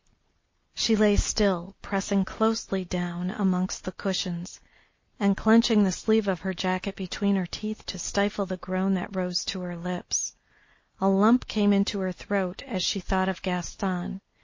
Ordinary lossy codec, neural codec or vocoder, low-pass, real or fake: MP3, 32 kbps; none; 7.2 kHz; real